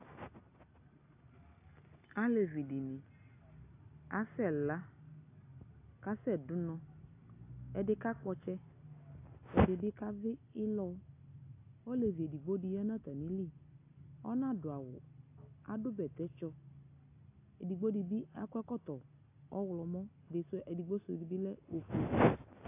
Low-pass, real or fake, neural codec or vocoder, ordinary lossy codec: 3.6 kHz; real; none; AAC, 24 kbps